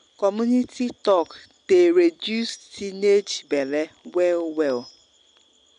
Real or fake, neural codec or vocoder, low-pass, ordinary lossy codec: real; none; 9.9 kHz; MP3, 96 kbps